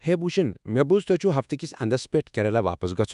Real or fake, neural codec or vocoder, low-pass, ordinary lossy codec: fake; codec, 24 kHz, 1.2 kbps, DualCodec; 10.8 kHz; none